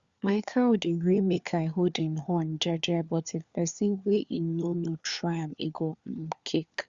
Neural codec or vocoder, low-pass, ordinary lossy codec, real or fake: codec, 16 kHz, 4 kbps, FunCodec, trained on LibriTTS, 50 frames a second; 7.2 kHz; Opus, 64 kbps; fake